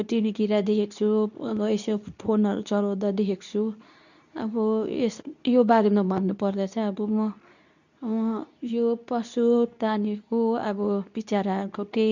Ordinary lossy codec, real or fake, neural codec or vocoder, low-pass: none; fake; codec, 24 kHz, 0.9 kbps, WavTokenizer, medium speech release version 2; 7.2 kHz